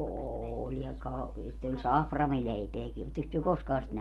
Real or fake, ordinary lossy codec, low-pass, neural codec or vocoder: real; Opus, 32 kbps; 19.8 kHz; none